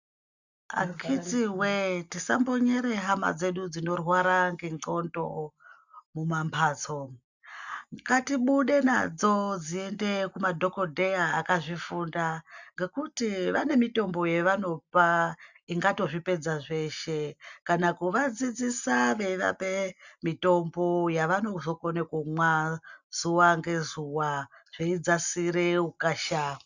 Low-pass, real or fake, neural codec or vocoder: 7.2 kHz; real; none